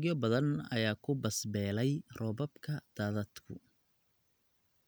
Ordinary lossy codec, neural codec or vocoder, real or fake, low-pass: none; none; real; none